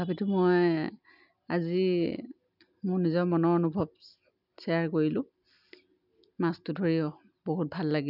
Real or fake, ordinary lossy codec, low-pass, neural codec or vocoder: real; none; 5.4 kHz; none